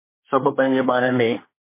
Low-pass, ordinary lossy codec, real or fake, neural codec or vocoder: 3.6 kHz; MP3, 24 kbps; fake; codec, 24 kHz, 1 kbps, SNAC